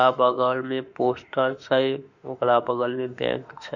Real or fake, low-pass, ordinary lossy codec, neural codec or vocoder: fake; 7.2 kHz; none; autoencoder, 48 kHz, 32 numbers a frame, DAC-VAE, trained on Japanese speech